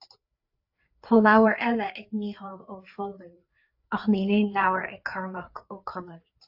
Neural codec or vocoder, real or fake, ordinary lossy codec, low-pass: codec, 16 kHz, 4 kbps, FreqCodec, larger model; fake; AAC, 48 kbps; 5.4 kHz